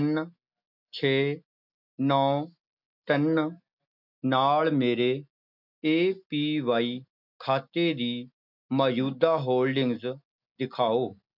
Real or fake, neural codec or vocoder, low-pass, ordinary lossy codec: real; none; 5.4 kHz; none